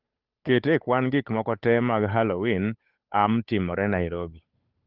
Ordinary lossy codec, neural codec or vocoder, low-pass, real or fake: Opus, 32 kbps; codec, 16 kHz, 8 kbps, FunCodec, trained on Chinese and English, 25 frames a second; 5.4 kHz; fake